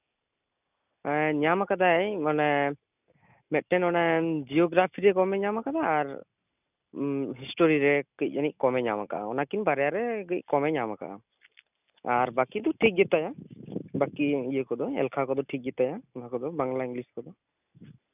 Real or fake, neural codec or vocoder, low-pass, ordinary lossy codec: real; none; 3.6 kHz; none